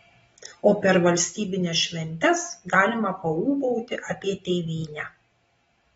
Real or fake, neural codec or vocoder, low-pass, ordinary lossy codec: real; none; 10.8 kHz; AAC, 24 kbps